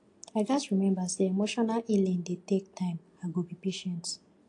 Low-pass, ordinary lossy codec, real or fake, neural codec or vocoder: 10.8 kHz; AAC, 48 kbps; real; none